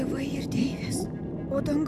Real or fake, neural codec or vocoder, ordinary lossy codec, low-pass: real; none; AAC, 96 kbps; 14.4 kHz